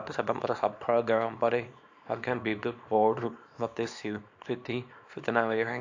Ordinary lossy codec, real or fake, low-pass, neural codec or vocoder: AAC, 48 kbps; fake; 7.2 kHz; codec, 24 kHz, 0.9 kbps, WavTokenizer, small release